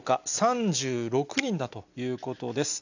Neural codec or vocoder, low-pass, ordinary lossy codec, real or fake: none; 7.2 kHz; none; real